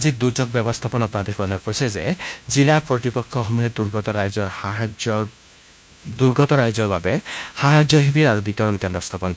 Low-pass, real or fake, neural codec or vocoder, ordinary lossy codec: none; fake; codec, 16 kHz, 1 kbps, FunCodec, trained on LibriTTS, 50 frames a second; none